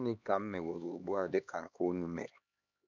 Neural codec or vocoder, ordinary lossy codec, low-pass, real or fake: codec, 16 kHz, 2 kbps, X-Codec, HuBERT features, trained on LibriSpeech; none; 7.2 kHz; fake